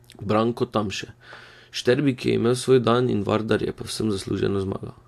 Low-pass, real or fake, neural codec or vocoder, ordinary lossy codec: 14.4 kHz; real; none; AAC, 64 kbps